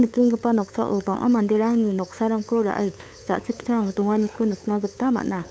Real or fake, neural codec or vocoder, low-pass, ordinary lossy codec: fake; codec, 16 kHz, 8 kbps, FunCodec, trained on LibriTTS, 25 frames a second; none; none